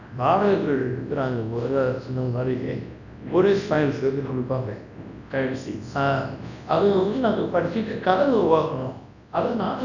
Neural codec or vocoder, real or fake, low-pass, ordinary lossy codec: codec, 24 kHz, 0.9 kbps, WavTokenizer, large speech release; fake; 7.2 kHz; none